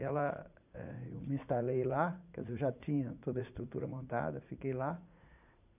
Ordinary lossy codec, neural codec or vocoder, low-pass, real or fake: none; vocoder, 44.1 kHz, 80 mel bands, Vocos; 3.6 kHz; fake